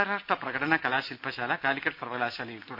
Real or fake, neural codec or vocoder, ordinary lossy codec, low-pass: real; none; none; 5.4 kHz